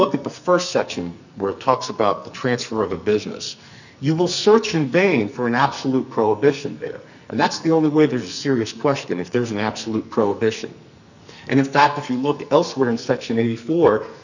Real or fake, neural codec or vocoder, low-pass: fake; codec, 44.1 kHz, 2.6 kbps, SNAC; 7.2 kHz